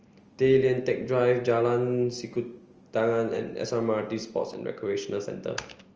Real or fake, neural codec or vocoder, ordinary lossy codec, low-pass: real; none; Opus, 24 kbps; 7.2 kHz